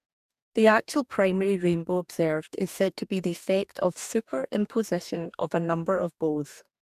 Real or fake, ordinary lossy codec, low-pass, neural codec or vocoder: fake; none; 14.4 kHz; codec, 44.1 kHz, 2.6 kbps, DAC